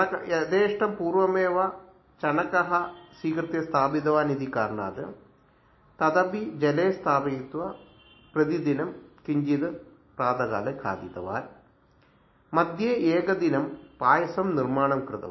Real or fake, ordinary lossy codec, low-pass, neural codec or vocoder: real; MP3, 24 kbps; 7.2 kHz; none